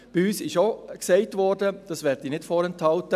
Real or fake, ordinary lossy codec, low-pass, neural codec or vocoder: real; none; 14.4 kHz; none